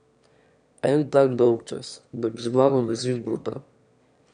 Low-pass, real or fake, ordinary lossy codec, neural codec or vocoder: 9.9 kHz; fake; none; autoencoder, 22.05 kHz, a latent of 192 numbers a frame, VITS, trained on one speaker